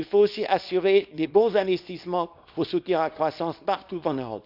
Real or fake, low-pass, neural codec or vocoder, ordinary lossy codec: fake; 5.4 kHz; codec, 24 kHz, 0.9 kbps, WavTokenizer, small release; none